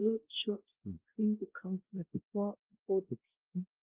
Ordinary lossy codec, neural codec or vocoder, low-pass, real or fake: Opus, 16 kbps; codec, 16 kHz, 0.5 kbps, X-Codec, WavLM features, trained on Multilingual LibriSpeech; 3.6 kHz; fake